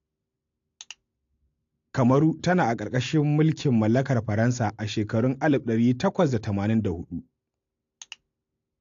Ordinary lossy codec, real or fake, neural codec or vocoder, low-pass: AAC, 48 kbps; real; none; 7.2 kHz